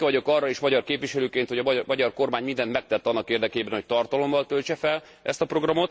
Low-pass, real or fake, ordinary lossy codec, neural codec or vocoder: none; real; none; none